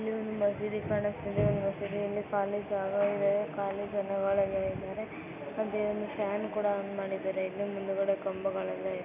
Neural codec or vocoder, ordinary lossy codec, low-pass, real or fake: none; none; 3.6 kHz; real